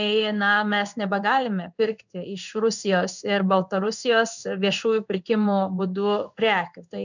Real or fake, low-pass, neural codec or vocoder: fake; 7.2 kHz; codec, 16 kHz in and 24 kHz out, 1 kbps, XY-Tokenizer